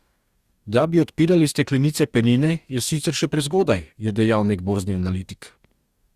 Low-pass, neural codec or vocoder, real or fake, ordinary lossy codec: 14.4 kHz; codec, 44.1 kHz, 2.6 kbps, DAC; fake; Opus, 64 kbps